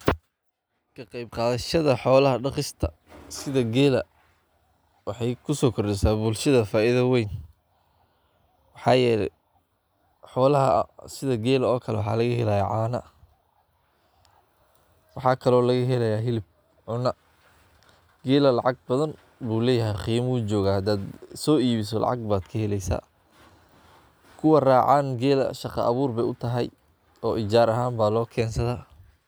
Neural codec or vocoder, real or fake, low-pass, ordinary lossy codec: none; real; none; none